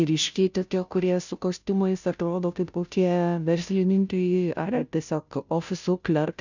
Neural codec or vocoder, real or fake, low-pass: codec, 16 kHz, 0.5 kbps, FunCodec, trained on Chinese and English, 25 frames a second; fake; 7.2 kHz